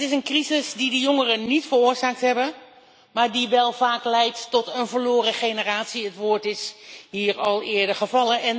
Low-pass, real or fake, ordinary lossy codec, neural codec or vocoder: none; real; none; none